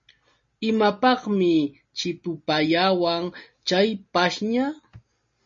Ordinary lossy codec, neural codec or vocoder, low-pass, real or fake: MP3, 32 kbps; none; 7.2 kHz; real